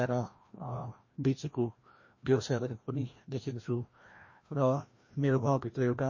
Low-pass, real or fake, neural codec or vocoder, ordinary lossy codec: 7.2 kHz; fake; codec, 16 kHz, 1 kbps, FreqCodec, larger model; MP3, 32 kbps